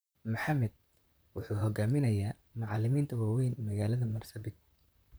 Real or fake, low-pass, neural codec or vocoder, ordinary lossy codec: fake; none; vocoder, 44.1 kHz, 128 mel bands, Pupu-Vocoder; none